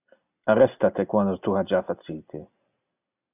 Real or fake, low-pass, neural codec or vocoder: real; 3.6 kHz; none